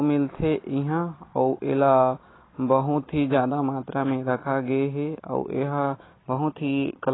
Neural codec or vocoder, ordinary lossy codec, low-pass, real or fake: none; AAC, 16 kbps; 7.2 kHz; real